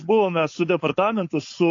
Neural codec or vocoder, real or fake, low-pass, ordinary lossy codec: codec, 16 kHz, 4.8 kbps, FACodec; fake; 7.2 kHz; AAC, 48 kbps